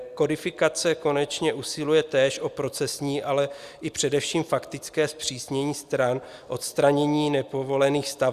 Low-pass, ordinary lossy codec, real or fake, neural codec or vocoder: 14.4 kHz; Opus, 64 kbps; real; none